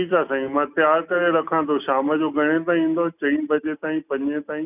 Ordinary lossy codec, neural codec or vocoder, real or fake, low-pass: none; none; real; 3.6 kHz